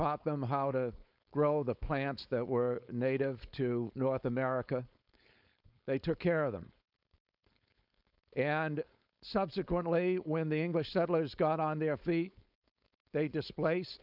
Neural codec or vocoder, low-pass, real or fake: codec, 16 kHz, 4.8 kbps, FACodec; 5.4 kHz; fake